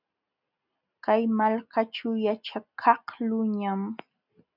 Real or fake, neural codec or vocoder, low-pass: real; none; 5.4 kHz